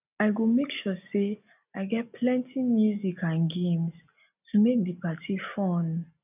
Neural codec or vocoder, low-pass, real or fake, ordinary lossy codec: none; 3.6 kHz; real; none